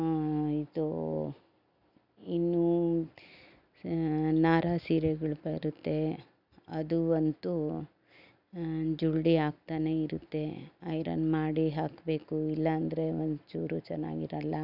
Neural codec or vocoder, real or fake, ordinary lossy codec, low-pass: none; real; none; 5.4 kHz